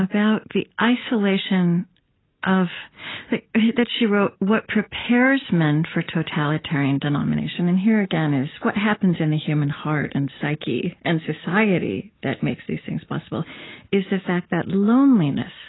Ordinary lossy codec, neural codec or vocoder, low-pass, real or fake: AAC, 16 kbps; none; 7.2 kHz; real